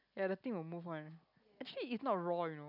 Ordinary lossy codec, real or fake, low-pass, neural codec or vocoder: none; real; 5.4 kHz; none